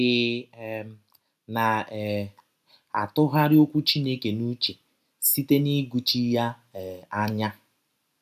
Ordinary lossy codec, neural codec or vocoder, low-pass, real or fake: none; none; 14.4 kHz; real